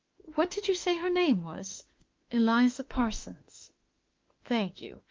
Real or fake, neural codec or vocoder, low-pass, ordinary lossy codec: fake; autoencoder, 48 kHz, 32 numbers a frame, DAC-VAE, trained on Japanese speech; 7.2 kHz; Opus, 32 kbps